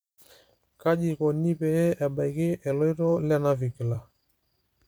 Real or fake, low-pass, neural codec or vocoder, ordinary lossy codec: fake; none; vocoder, 44.1 kHz, 128 mel bands every 256 samples, BigVGAN v2; none